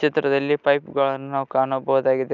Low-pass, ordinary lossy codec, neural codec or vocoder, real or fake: 7.2 kHz; none; none; real